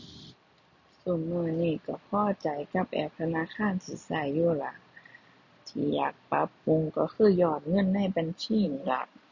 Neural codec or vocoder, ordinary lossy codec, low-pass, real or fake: none; none; 7.2 kHz; real